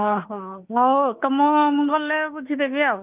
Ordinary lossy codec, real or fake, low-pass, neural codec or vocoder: Opus, 24 kbps; fake; 3.6 kHz; autoencoder, 48 kHz, 32 numbers a frame, DAC-VAE, trained on Japanese speech